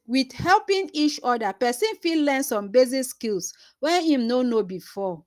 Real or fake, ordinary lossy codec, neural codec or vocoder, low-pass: real; Opus, 32 kbps; none; 14.4 kHz